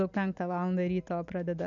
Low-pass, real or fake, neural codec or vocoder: 7.2 kHz; real; none